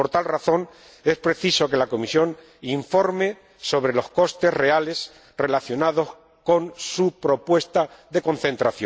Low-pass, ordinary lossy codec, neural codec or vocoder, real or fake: none; none; none; real